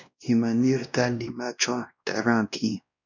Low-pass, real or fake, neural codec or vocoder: 7.2 kHz; fake; codec, 16 kHz, 1 kbps, X-Codec, WavLM features, trained on Multilingual LibriSpeech